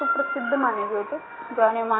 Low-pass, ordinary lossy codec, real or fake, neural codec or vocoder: 7.2 kHz; AAC, 16 kbps; real; none